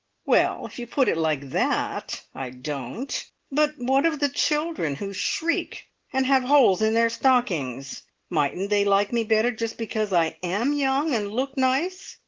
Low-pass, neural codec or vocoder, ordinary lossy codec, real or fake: 7.2 kHz; none; Opus, 16 kbps; real